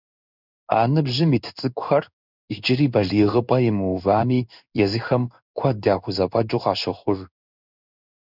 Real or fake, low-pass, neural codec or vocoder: fake; 5.4 kHz; codec, 16 kHz in and 24 kHz out, 1 kbps, XY-Tokenizer